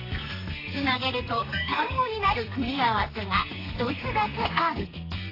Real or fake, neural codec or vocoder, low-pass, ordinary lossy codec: fake; codec, 32 kHz, 1.9 kbps, SNAC; 5.4 kHz; AAC, 24 kbps